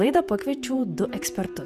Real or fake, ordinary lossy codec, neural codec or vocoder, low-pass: fake; Opus, 64 kbps; vocoder, 48 kHz, 128 mel bands, Vocos; 14.4 kHz